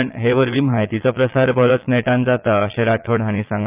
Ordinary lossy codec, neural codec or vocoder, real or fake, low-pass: Opus, 24 kbps; vocoder, 22.05 kHz, 80 mel bands, Vocos; fake; 3.6 kHz